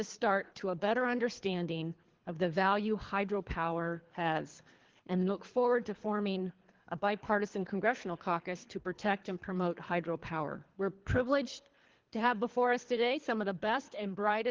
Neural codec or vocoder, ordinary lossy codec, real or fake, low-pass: codec, 24 kHz, 3 kbps, HILCodec; Opus, 16 kbps; fake; 7.2 kHz